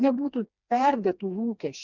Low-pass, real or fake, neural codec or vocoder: 7.2 kHz; fake; codec, 16 kHz, 2 kbps, FreqCodec, smaller model